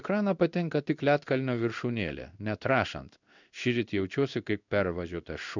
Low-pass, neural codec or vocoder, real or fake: 7.2 kHz; codec, 16 kHz in and 24 kHz out, 1 kbps, XY-Tokenizer; fake